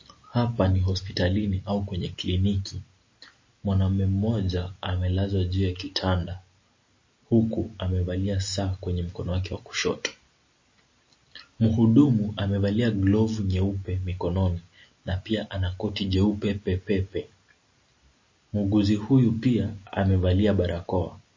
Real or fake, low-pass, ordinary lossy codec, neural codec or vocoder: real; 7.2 kHz; MP3, 32 kbps; none